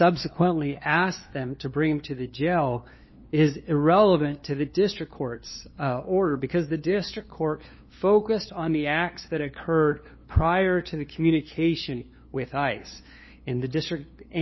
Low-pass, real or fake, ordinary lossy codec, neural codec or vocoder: 7.2 kHz; fake; MP3, 24 kbps; codec, 16 kHz, 2 kbps, FunCodec, trained on LibriTTS, 25 frames a second